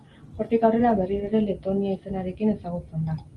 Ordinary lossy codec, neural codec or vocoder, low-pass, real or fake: Opus, 24 kbps; none; 10.8 kHz; real